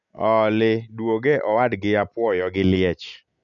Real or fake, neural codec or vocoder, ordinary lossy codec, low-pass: real; none; none; 7.2 kHz